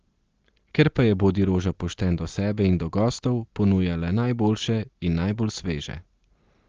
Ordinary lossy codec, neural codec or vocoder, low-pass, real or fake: Opus, 16 kbps; none; 7.2 kHz; real